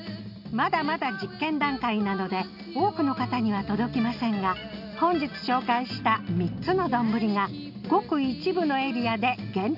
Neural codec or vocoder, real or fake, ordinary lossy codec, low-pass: none; real; none; 5.4 kHz